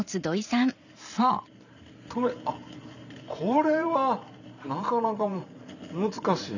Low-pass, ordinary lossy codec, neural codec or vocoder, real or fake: 7.2 kHz; none; vocoder, 44.1 kHz, 128 mel bands every 512 samples, BigVGAN v2; fake